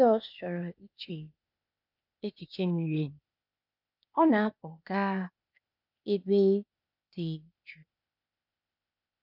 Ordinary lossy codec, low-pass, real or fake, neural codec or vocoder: none; 5.4 kHz; fake; codec, 16 kHz, 0.8 kbps, ZipCodec